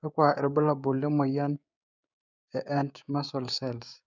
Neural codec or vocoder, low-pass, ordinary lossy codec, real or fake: codec, 16 kHz, 6 kbps, DAC; 7.2 kHz; none; fake